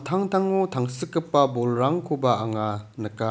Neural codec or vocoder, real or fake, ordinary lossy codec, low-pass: none; real; none; none